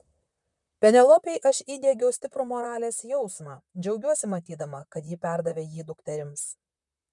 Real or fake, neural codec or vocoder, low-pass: fake; vocoder, 44.1 kHz, 128 mel bands, Pupu-Vocoder; 10.8 kHz